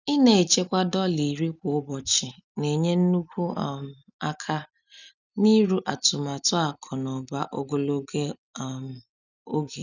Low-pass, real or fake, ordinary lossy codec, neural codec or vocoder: 7.2 kHz; real; none; none